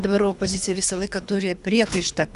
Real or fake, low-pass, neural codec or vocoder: fake; 10.8 kHz; codec, 24 kHz, 3 kbps, HILCodec